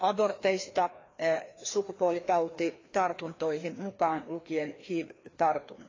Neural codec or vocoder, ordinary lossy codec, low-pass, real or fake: codec, 16 kHz, 2 kbps, FreqCodec, larger model; AAC, 32 kbps; 7.2 kHz; fake